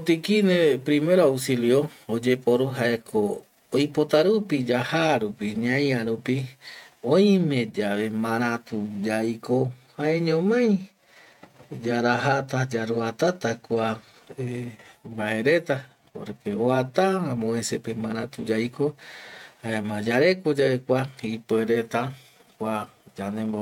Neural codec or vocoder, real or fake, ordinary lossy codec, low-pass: vocoder, 48 kHz, 128 mel bands, Vocos; fake; none; 19.8 kHz